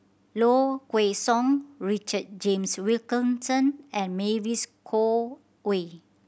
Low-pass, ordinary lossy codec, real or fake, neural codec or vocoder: none; none; real; none